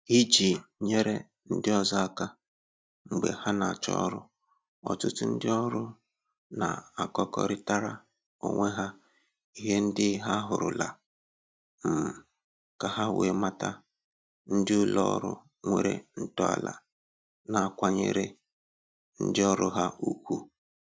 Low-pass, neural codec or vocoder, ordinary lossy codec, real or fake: none; none; none; real